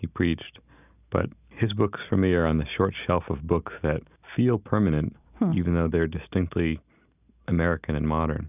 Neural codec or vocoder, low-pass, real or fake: none; 3.6 kHz; real